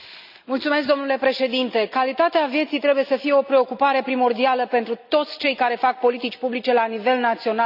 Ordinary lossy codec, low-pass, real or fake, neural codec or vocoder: none; 5.4 kHz; real; none